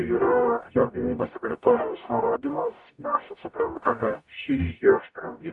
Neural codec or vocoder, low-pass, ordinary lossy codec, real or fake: codec, 44.1 kHz, 0.9 kbps, DAC; 10.8 kHz; MP3, 96 kbps; fake